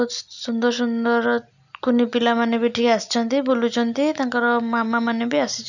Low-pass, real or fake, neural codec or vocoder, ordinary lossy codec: 7.2 kHz; real; none; none